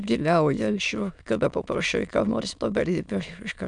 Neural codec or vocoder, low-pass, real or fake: autoencoder, 22.05 kHz, a latent of 192 numbers a frame, VITS, trained on many speakers; 9.9 kHz; fake